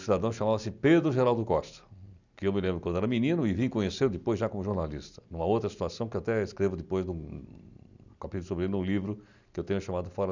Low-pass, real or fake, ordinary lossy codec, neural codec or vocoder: 7.2 kHz; real; none; none